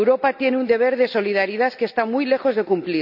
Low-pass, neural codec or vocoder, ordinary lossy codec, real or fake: 5.4 kHz; none; none; real